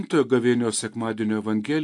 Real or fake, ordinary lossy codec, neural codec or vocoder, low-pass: real; AAC, 64 kbps; none; 10.8 kHz